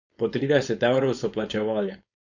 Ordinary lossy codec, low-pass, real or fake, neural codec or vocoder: none; 7.2 kHz; fake; codec, 16 kHz, 4.8 kbps, FACodec